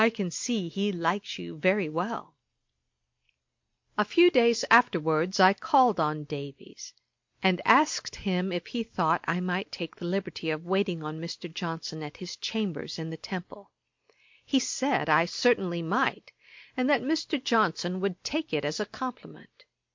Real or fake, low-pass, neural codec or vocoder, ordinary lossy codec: real; 7.2 kHz; none; MP3, 48 kbps